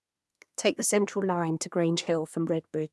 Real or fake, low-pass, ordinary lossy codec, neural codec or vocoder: fake; none; none; codec, 24 kHz, 1 kbps, SNAC